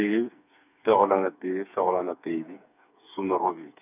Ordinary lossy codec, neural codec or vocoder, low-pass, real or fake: none; codec, 16 kHz, 4 kbps, FreqCodec, smaller model; 3.6 kHz; fake